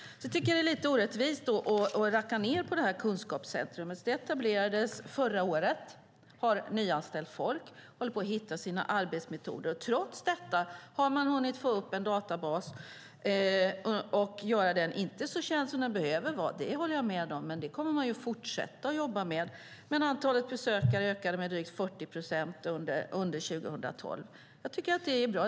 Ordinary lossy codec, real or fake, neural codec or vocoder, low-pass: none; real; none; none